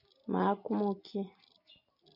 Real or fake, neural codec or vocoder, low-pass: real; none; 5.4 kHz